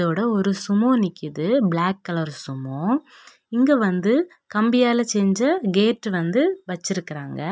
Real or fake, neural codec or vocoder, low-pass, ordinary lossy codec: real; none; none; none